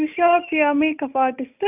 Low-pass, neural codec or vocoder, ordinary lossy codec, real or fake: 3.6 kHz; none; none; real